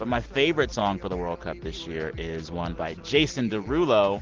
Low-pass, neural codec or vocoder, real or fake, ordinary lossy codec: 7.2 kHz; none; real; Opus, 16 kbps